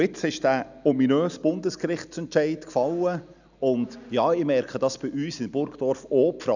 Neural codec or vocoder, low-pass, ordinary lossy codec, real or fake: none; 7.2 kHz; none; real